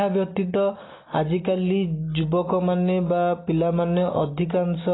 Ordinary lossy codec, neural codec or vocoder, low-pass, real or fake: AAC, 16 kbps; none; 7.2 kHz; real